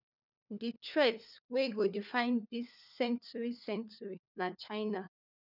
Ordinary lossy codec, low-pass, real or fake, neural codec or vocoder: none; 5.4 kHz; fake; codec, 16 kHz, 4 kbps, FunCodec, trained on LibriTTS, 50 frames a second